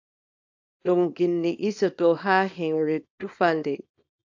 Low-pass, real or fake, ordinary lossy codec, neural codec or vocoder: 7.2 kHz; fake; AAC, 48 kbps; codec, 24 kHz, 0.9 kbps, WavTokenizer, small release